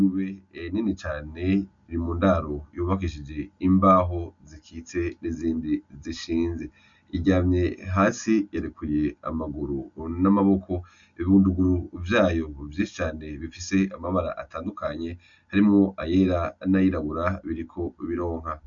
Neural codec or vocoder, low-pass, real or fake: none; 7.2 kHz; real